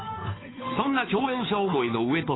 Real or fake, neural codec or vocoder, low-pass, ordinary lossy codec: fake; codec, 16 kHz, 2 kbps, FunCodec, trained on Chinese and English, 25 frames a second; 7.2 kHz; AAC, 16 kbps